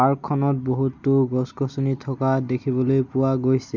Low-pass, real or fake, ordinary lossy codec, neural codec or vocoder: 7.2 kHz; real; none; none